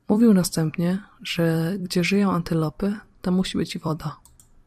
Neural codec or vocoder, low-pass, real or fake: vocoder, 44.1 kHz, 128 mel bands every 256 samples, BigVGAN v2; 14.4 kHz; fake